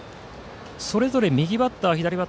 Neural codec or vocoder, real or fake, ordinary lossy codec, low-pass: none; real; none; none